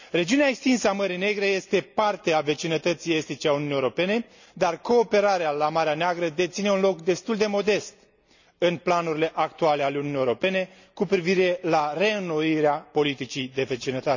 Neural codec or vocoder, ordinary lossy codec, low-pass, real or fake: none; none; 7.2 kHz; real